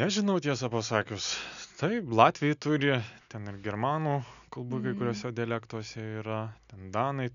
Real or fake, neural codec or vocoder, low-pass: real; none; 7.2 kHz